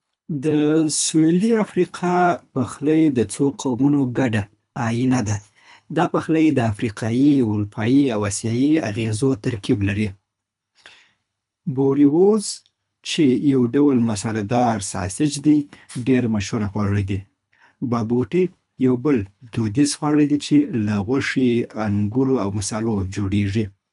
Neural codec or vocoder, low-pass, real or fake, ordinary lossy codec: codec, 24 kHz, 3 kbps, HILCodec; 10.8 kHz; fake; none